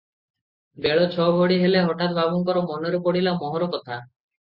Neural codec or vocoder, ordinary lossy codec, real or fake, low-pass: none; Opus, 64 kbps; real; 5.4 kHz